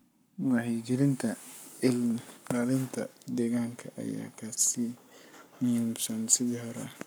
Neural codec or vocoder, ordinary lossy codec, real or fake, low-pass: codec, 44.1 kHz, 7.8 kbps, Pupu-Codec; none; fake; none